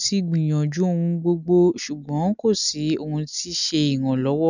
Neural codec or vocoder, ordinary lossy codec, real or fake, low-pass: none; none; real; 7.2 kHz